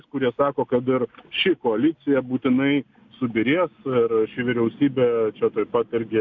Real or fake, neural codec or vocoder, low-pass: real; none; 7.2 kHz